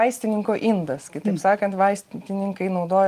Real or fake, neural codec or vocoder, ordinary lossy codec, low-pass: real; none; Opus, 32 kbps; 14.4 kHz